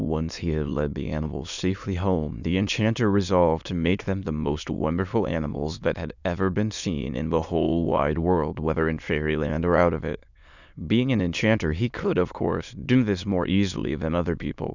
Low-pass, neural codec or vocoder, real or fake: 7.2 kHz; autoencoder, 22.05 kHz, a latent of 192 numbers a frame, VITS, trained on many speakers; fake